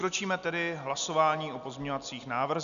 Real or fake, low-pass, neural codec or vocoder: real; 7.2 kHz; none